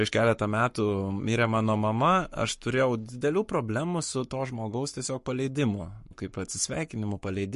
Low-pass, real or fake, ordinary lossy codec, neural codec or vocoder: 14.4 kHz; fake; MP3, 48 kbps; codec, 44.1 kHz, 7.8 kbps, Pupu-Codec